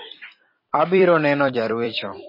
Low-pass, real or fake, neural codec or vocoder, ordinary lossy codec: 5.4 kHz; fake; vocoder, 44.1 kHz, 128 mel bands every 256 samples, BigVGAN v2; MP3, 24 kbps